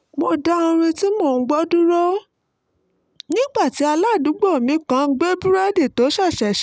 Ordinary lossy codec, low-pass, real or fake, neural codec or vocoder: none; none; real; none